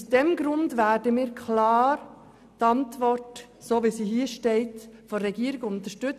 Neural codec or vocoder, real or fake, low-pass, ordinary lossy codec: none; real; 14.4 kHz; none